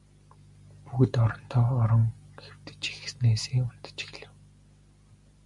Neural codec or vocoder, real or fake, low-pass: none; real; 10.8 kHz